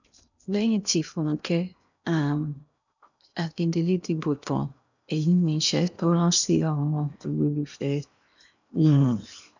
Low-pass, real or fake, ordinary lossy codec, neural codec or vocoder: 7.2 kHz; fake; none; codec, 16 kHz in and 24 kHz out, 0.8 kbps, FocalCodec, streaming, 65536 codes